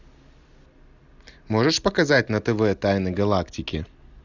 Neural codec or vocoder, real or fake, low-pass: none; real; 7.2 kHz